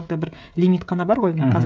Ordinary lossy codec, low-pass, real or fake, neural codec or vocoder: none; none; fake; codec, 16 kHz, 16 kbps, FreqCodec, smaller model